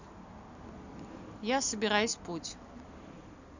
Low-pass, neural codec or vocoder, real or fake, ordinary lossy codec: 7.2 kHz; none; real; none